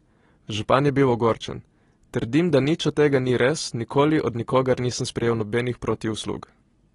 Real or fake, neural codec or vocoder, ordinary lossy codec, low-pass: real; none; AAC, 32 kbps; 10.8 kHz